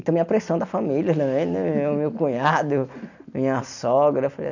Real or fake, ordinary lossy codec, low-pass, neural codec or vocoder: real; none; 7.2 kHz; none